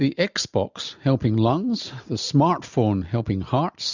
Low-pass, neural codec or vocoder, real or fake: 7.2 kHz; none; real